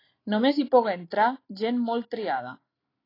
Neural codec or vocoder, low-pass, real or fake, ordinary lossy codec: none; 5.4 kHz; real; AAC, 24 kbps